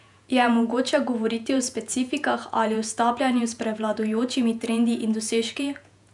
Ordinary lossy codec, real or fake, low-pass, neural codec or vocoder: none; fake; 10.8 kHz; vocoder, 48 kHz, 128 mel bands, Vocos